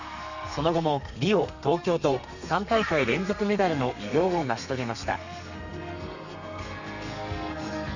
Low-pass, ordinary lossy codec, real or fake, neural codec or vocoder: 7.2 kHz; none; fake; codec, 32 kHz, 1.9 kbps, SNAC